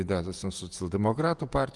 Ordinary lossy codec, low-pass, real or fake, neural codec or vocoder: Opus, 32 kbps; 10.8 kHz; fake; vocoder, 48 kHz, 128 mel bands, Vocos